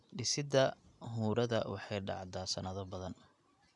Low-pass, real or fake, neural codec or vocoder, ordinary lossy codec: 10.8 kHz; real; none; none